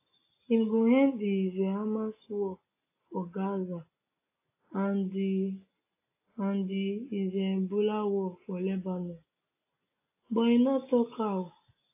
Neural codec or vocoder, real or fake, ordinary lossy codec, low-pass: none; real; AAC, 24 kbps; 3.6 kHz